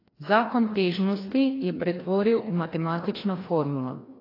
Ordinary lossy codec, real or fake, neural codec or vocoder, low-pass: AAC, 24 kbps; fake; codec, 16 kHz, 1 kbps, FreqCodec, larger model; 5.4 kHz